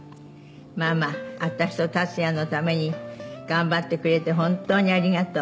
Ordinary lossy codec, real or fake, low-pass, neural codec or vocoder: none; real; none; none